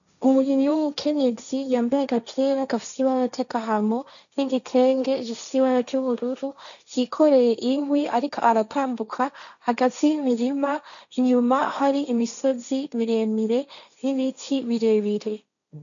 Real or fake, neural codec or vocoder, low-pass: fake; codec, 16 kHz, 1.1 kbps, Voila-Tokenizer; 7.2 kHz